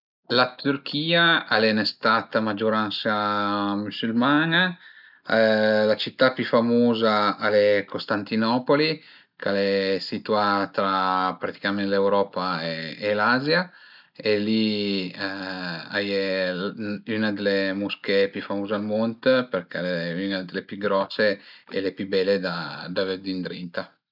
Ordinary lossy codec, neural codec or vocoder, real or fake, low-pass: none; none; real; 5.4 kHz